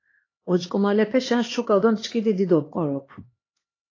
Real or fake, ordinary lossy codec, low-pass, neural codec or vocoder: fake; AAC, 32 kbps; 7.2 kHz; codec, 16 kHz, 2 kbps, X-Codec, HuBERT features, trained on LibriSpeech